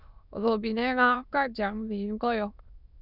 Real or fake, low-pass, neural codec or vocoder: fake; 5.4 kHz; autoencoder, 22.05 kHz, a latent of 192 numbers a frame, VITS, trained on many speakers